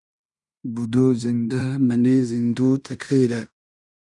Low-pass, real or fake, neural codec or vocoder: 10.8 kHz; fake; codec, 16 kHz in and 24 kHz out, 0.9 kbps, LongCat-Audio-Codec, fine tuned four codebook decoder